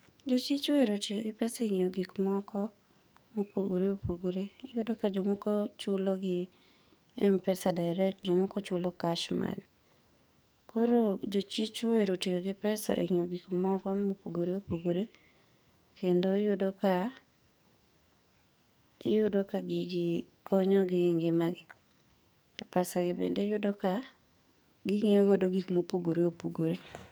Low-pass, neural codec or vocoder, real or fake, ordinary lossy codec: none; codec, 44.1 kHz, 2.6 kbps, SNAC; fake; none